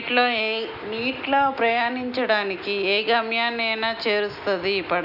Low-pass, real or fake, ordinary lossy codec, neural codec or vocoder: 5.4 kHz; real; none; none